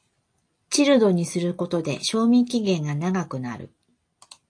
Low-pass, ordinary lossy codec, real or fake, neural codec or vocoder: 9.9 kHz; AAC, 48 kbps; real; none